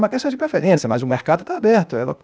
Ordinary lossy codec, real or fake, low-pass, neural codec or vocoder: none; fake; none; codec, 16 kHz, 0.8 kbps, ZipCodec